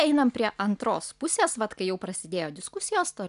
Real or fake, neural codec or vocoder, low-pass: real; none; 10.8 kHz